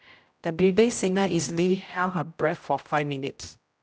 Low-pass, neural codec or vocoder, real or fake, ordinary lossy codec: none; codec, 16 kHz, 0.5 kbps, X-Codec, HuBERT features, trained on general audio; fake; none